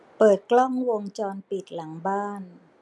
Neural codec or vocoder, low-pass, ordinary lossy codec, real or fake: none; none; none; real